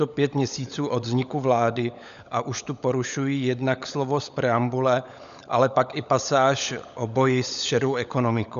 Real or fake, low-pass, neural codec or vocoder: fake; 7.2 kHz; codec, 16 kHz, 16 kbps, FunCodec, trained on LibriTTS, 50 frames a second